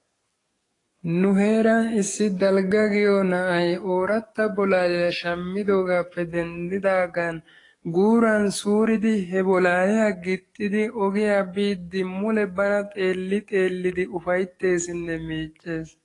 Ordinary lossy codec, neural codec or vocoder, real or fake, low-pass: AAC, 32 kbps; codec, 44.1 kHz, 7.8 kbps, DAC; fake; 10.8 kHz